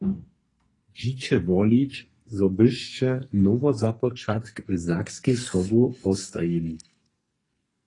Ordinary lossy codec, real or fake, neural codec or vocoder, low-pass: AAC, 32 kbps; fake; codec, 44.1 kHz, 2.6 kbps, SNAC; 10.8 kHz